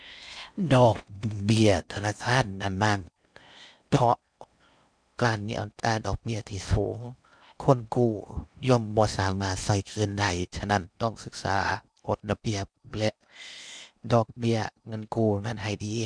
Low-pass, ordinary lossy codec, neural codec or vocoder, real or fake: 9.9 kHz; none; codec, 16 kHz in and 24 kHz out, 0.6 kbps, FocalCodec, streaming, 4096 codes; fake